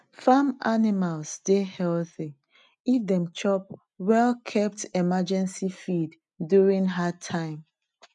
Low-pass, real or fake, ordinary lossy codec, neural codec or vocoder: 10.8 kHz; real; none; none